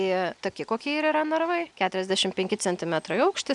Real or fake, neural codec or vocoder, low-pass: real; none; 10.8 kHz